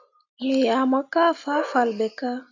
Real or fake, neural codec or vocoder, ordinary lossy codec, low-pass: real; none; AAC, 48 kbps; 7.2 kHz